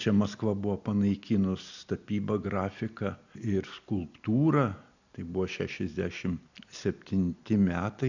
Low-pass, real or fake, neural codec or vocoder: 7.2 kHz; real; none